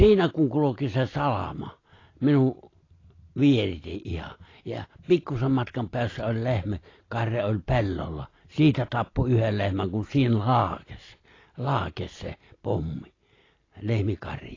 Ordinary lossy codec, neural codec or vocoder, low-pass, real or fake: AAC, 32 kbps; none; 7.2 kHz; real